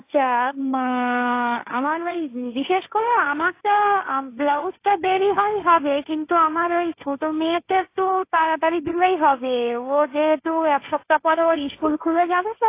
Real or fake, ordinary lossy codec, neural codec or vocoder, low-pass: fake; AAC, 24 kbps; codec, 16 kHz, 1.1 kbps, Voila-Tokenizer; 3.6 kHz